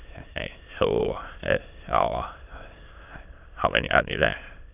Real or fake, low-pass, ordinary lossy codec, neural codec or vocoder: fake; 3.6 kHz; AAC, 32 kbps; autoencoder, 22.05 kHz, a latent of 192 numbers a frame, VITS, trained on many speakers